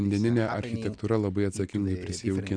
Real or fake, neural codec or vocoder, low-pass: real; none; 9.9 kHz